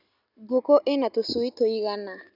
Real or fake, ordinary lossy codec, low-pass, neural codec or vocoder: real; none; 5.4 kHz; none